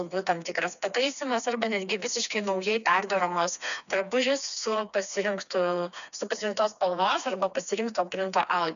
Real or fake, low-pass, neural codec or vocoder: fake; 7.2 kHz; codec, 16 kHz, 2 kbps, FreqCodec, smaller model